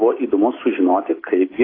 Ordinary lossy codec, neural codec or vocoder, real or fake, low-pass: AAC, 24 kbps; none; real; 5.4 kHz